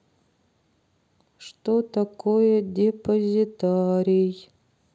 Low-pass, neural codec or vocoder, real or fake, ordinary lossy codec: none; none; real; none